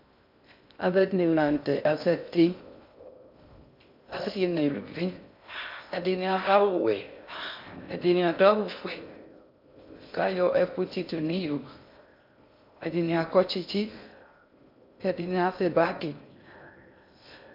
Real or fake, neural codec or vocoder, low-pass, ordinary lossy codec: fake; codec, 16 kHz in and 24 kHz out, 0.6 kbps, FocalCodec, streaming, 2048 codes; 5.4 kHz; MP3, 48 kbps